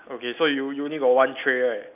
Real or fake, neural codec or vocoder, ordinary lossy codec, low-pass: real; none; none; 3.6 kHz